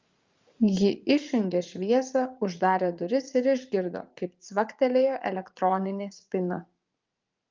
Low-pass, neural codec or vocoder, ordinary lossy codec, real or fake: 7.2 kHz; vocoder, 44.1 kHz, 80 mel bands, Vocos; Opus, 32 kbps; fake